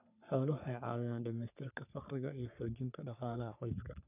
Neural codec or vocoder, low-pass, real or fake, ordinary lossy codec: codec, 44.1 kHz, 3.4 kbps, Pupu-Codec; 3.6 kHz; fake; none